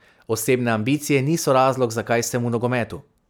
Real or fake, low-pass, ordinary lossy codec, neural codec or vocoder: real; none; none; none